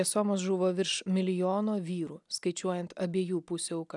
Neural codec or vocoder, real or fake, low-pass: none; real; 10.8 kHz